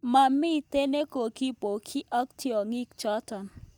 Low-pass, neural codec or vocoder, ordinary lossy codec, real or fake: none; none; none; real